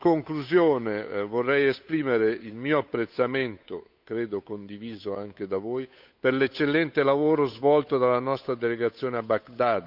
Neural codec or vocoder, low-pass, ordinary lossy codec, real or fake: codec, 16 kHz, 8 kbps, FunCodec, trained on Chinese and English, 25 frames a second; 5.4 kHz; none; fake